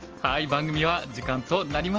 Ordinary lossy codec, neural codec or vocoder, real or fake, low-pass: Opus, 24 kbps; none; real; 7.2 kHz